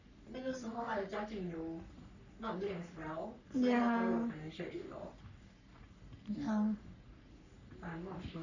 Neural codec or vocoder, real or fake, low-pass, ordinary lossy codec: codec, 44.1 kHz, 3.4 kbps, Pupu-Codec; fake; 7.2 kHz; none